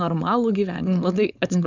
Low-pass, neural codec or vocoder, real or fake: 7.2 kHz; codec, 16 kHz, 4.8 kbps, FACodec; fake